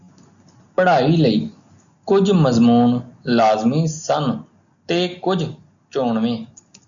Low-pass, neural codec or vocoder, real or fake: 7.2 kHz; none; real